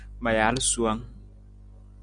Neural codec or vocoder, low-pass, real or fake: none; 9.9 kHz; real